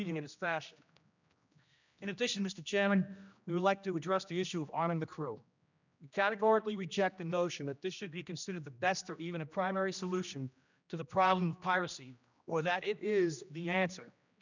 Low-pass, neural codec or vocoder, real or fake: 7.2 kHz; codec, 16 kHz, 1 kbps, X-Codec, HuBERT features, trained on general audio; fake